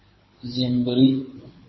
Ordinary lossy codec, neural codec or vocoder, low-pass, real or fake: MP3, 24 kbps; codec, 24 kHz, 6 kbps, HILCodec; 7.2 kHz; fake